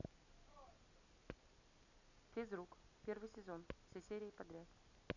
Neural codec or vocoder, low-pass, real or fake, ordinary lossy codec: none; 7.2 kHz; real; none